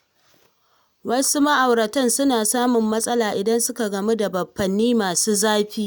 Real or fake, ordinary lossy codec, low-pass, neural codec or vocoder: real; none; none; none